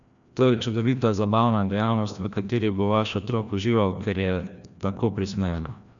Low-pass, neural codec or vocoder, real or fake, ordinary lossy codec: 7.2 kHz; codec, 16 kHz, 1 kbps, FreqCodec, larger model; fake; none